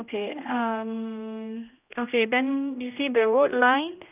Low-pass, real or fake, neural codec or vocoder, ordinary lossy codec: 3.6 kHz; fake; codec, 16 kHz, 1 kbps, X-Codec, HuBERT features, trained on general audio; none